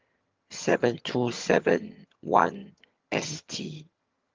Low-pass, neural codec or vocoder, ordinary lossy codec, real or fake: 7.2 kHz; vocoder, 22.05 kHz, 80 mel bands, HiFi-GAN; Opus, 32 kbps; fake